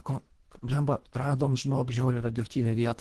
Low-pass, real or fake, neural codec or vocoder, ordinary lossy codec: 10.8 kHz; fake; codec, 24 kHz, 1.5 kbps, HILCodec; Opus, 16 kbps